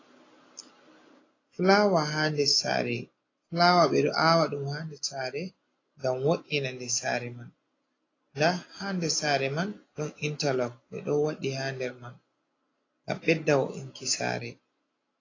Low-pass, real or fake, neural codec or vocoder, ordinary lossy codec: 7.2 kHz; real; none; AAC, 32 kbps